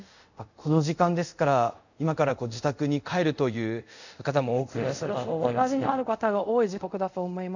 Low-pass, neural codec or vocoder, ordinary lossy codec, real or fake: 7.2 kHz; codec, 24 kHz, 0.5 kbps, DualCodec; none; fake